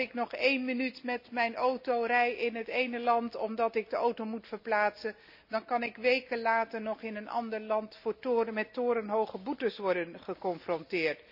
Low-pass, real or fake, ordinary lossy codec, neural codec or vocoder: 5.4 kHz; real; none; none